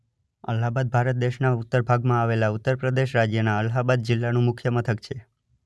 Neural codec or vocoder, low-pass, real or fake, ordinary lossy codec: none; none; real; none